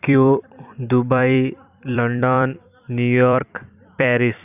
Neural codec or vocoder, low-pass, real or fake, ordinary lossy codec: vocoder, 44.1 kHz, 128 mel bands every 256 samples, BigVGAN v2; 3.6 kHz; fake; none